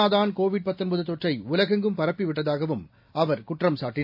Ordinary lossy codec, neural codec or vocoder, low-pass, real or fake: AAC, 48 kbps; none; 5.4 kHz; real